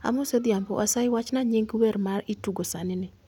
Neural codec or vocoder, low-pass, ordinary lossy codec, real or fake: none; 19.8 kHz; none; real